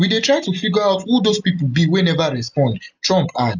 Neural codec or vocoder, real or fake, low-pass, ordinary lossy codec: none; real; 7.2 kHz; none